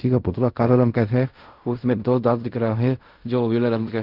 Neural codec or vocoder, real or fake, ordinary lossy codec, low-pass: codec, 16 kHz in and 24 kHz out, 0.4 kbps, LongCat-Audio-Codec, fine tuned four codebook decoder; fake; Opus, 24 kbps; 5.4 kHz